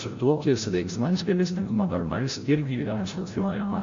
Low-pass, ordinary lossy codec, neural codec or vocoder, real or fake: 7.2 kHz; AAC, 48 kbps; codec, 16 kHz, 0.5 kbps, FreqCodec, larger model; fake